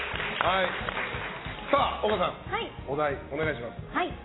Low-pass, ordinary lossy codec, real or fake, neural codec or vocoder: 7.2 kHz; AAC, 16 kbps; real; none